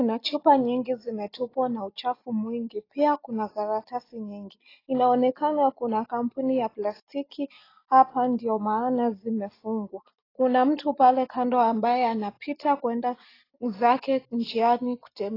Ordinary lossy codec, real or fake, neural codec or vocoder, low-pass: AAC, 24 kbps; real; none; 5.4 kHz